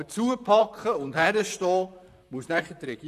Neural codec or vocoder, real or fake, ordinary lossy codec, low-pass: vocoder, 44.1 kHz, 128 mel bands, Pupu-Vocoder; fake; none; 14.4 kHz